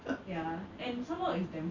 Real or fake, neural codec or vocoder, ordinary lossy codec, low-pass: real; none; none; 7.2 kHz